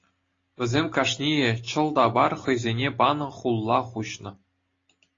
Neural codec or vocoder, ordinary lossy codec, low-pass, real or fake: none; AAC, 32 kbps; 7.2 kHz; real